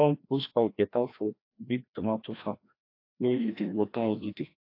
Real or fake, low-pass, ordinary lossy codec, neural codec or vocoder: fake; 5.4 kHz; AAC, 32 kbps; codec, 16 kHz, 1 kbps, FreqCodec, larger model